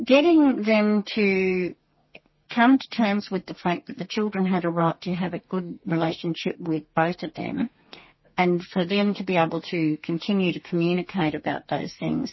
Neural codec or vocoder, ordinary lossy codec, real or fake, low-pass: codec, 32 kHz, 1.9 kbps, SNAC; MP3, 24 kbps; fake; 7.2 kHz